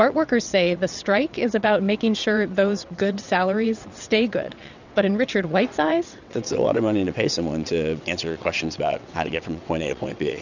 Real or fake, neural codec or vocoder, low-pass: fake; vocoder, 22.05 kHz, 80 mel bands, WaveNeXt; 7.2 kHz